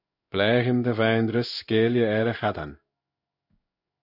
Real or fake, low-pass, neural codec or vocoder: fake; 5.4 kHz; codec, 16 kHz in and 24 kHz out, 1 kbps, XY-Tokenizer